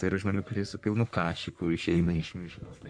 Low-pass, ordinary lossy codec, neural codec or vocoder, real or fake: 9.9 kHz; MP3, 96 kbps; codec, 44.1 kHz, 3.4 kbps, Pupu-Codec; fake